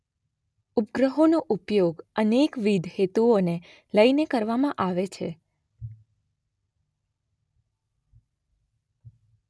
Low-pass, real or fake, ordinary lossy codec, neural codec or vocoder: none; real; none; none